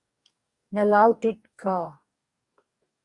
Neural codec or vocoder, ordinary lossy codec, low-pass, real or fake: codec, 44.1 kHz, 2.6 kbps, DAC; Opus, 64 kbps; 10.8 kHz; fake